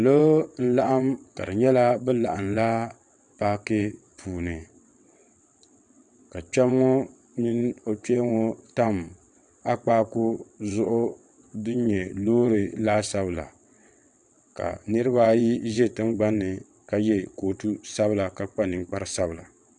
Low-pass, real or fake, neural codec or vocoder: 9.9 kHz; fake; vocoder, 22.05 kHz, 80 mel bands, WaveNeXt